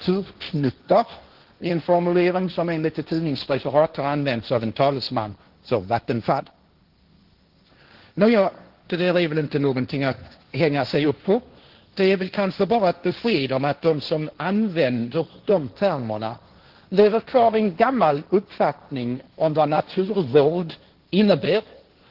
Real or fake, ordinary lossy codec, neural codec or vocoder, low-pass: fake; Opus, 16 kbps; codec, 16 kHz, 1.1 kbps, Voila-Tokenizer; 5.4 kHz